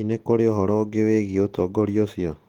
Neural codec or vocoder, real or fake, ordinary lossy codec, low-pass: none; real; Opus, 16 kbps; 19.8 kHz